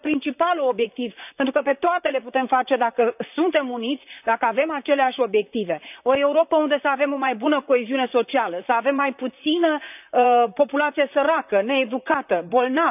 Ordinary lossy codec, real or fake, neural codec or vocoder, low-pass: none; fake; vocoder, 44.1 kHz, 128 mel bands, Pupu-Vocoder; 3.6 kHz